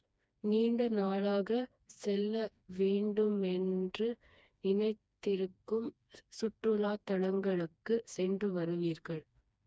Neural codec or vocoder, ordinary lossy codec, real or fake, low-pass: codec, 16 kHz, 2 kbps, FreqCodec, smaller model; none; fake; none